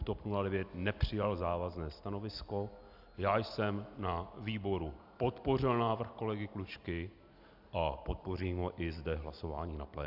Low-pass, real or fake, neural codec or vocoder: 5.4 kHz; real; none